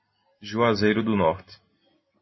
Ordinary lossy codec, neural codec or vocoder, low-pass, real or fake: MP3, 24 kbps; none; 7.2 kHz; real